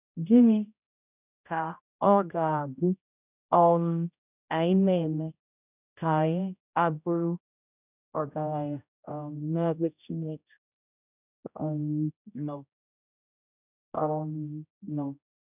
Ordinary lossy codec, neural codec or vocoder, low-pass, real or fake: none; codec, 16 kHz, 0.5 kbps, X-Codec, HuBERT features, trained on general audio; 3.6 kHz; fake